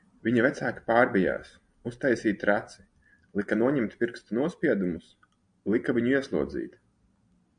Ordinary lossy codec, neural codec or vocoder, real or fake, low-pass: MP3, 64 kbps; none; real; 9.9 kHz